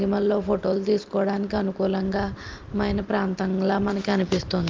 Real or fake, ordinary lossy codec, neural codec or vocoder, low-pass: real; Opus, 32 kbps; none; 7.2 kHz